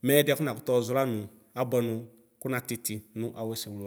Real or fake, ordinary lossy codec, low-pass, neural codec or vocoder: real; none; none; none